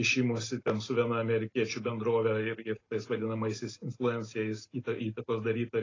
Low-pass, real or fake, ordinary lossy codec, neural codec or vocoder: 7.2 kHz; real; AAC, 32 kbps; none